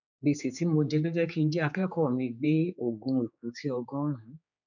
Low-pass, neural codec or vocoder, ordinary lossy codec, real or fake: 7.2 kHz; codec, 16 kHz, 4 kbps, X-Codec, HuBERT features, trained on general audio; none; fake